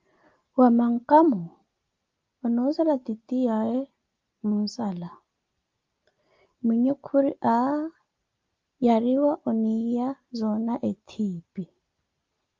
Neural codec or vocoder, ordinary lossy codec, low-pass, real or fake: none; Opus, 24 kbps; 7.2 kHz; real